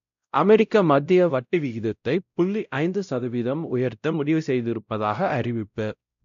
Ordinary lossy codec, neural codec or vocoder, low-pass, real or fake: none; codec, 16 kHz, 0.5 kbps, X-Codec, WavLM features, trained on Multilingual LibriSpeech; 7.2 kHz; fake